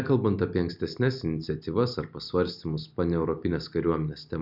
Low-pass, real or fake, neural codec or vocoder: 5.4 kHz; real; none